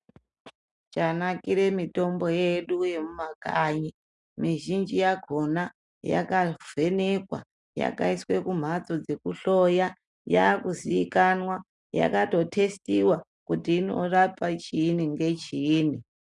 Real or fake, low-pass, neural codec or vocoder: real; 10.8 kHz; none